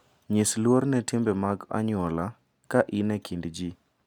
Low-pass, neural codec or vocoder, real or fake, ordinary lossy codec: 19.8 kHz; none; real; none